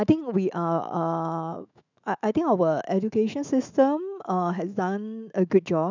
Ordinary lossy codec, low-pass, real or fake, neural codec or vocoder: none; 7.2 kHz; fake; autoencoder, 48 kHz, 128 numbers a frame, DAC-VAE, trained on Japanese speech